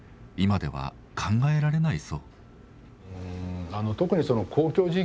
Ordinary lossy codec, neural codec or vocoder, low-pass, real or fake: none; none; none; real